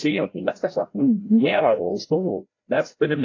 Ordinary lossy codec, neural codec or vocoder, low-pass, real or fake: AAC, 32 kbps; codec, 16 kHz, 0.5 kbps, FreqCodec, larger model; 7.2 kHz; fake